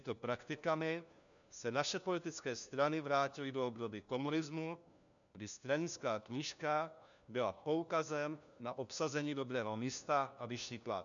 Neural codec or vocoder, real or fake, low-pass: codec, 16 kHz, 1 kbps, FunCodec, trained on LibriTTS, 50 frames a second; fake; 7.2 kHz